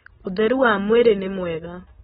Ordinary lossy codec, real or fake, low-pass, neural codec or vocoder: AAC, 16 kbps; real; 10.8 kHz; none